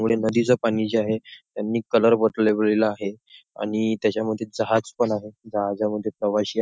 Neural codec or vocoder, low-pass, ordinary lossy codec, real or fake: none; none; none; real